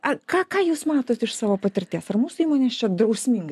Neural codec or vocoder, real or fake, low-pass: none; real; 14.4 kHz